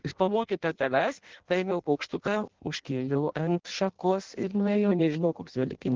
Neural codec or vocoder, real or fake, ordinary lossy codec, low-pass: codec, 16 kHz in and 24 kHz out, 0.6 kbps, FireRedTTS-2 codec; fake; Opus, 16 kbps; 7.2 kHz